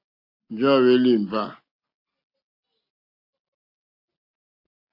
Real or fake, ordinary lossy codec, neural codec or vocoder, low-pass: real; AAC, 32 kbps; none; 5.4 kHz